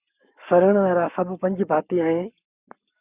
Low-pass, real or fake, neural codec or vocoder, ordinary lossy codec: 3.6 kHz; fake; codec, 44.1 kHz, 7.8 kbps, Pupu-Codec; Opus, 64 kbps